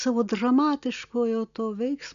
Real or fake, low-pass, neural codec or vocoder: real; 7.2 kHz; none